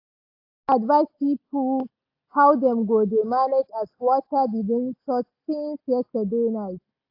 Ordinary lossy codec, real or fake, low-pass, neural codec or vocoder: AAC, 32 kbps; real; 5.4 kHz; none